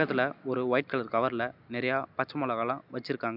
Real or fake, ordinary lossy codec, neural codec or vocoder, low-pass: real; none; none; 5.4 kHz